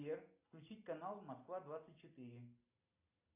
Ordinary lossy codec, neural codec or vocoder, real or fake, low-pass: AAC, 32 kbps; none; real; 3.6 kHz